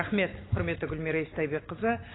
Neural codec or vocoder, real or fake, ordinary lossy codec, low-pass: none; real; AAC, 16 kbps; 7.2 kHz